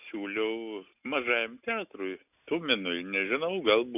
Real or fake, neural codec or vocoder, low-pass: real; none; 3.6 kHz